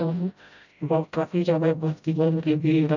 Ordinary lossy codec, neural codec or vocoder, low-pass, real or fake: none; codec, 16 kHz, 0.5 kbps, FreqCodec, smaller model; 7.2 kHz; fake